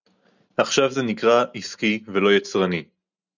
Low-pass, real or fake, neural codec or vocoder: 7.2 kHz; real; none